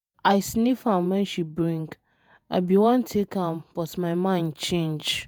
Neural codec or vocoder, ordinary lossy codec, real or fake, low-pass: vocoder, 48 kHz, 128 mel bands, Vocos; none; fake; none